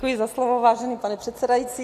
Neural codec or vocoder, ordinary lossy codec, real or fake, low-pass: none; AAC, 64 kbps; real; 14.4 kHz